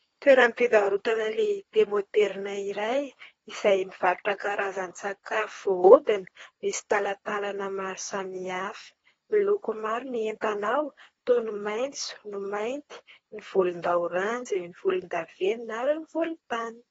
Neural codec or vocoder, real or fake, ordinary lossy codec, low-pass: codec, 24 kHz, 3 kbps, HILCodec; fake; AAC, 24 kbps; 10.8 kHz